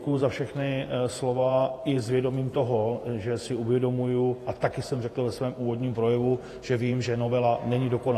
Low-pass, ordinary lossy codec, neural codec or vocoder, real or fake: 14.4 kHz; AAC, 48 kbps; vocoder, 48 kHz, 128 mel bands, Vocos; fake